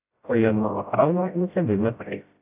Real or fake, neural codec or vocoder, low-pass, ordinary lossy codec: fake; codec, 16 kHz, 0.5 kbps, FreqCodec, smaller model; 3.6 kHz; AAC, 32 kbps